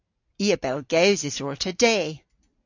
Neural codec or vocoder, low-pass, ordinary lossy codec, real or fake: none; 7.2 kHz; AAC, 48 kbps; real